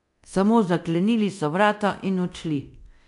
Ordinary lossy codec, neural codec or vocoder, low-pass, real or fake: none; codec, 24 kHz, 0.9 kbps, DualCodec; 10.8 kHz; fake